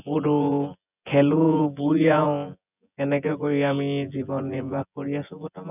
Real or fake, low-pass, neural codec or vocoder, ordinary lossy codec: fake; 3.6 kHz; vocoder, 24 kHz, 100 mel bands, Vocos; none